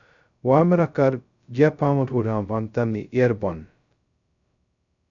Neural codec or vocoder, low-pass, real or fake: codec, 16 kHz, 0.2 kbps, FocalCodec; 7.2 kHz; fake